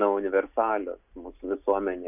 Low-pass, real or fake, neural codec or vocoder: 3.6 kHz; real; none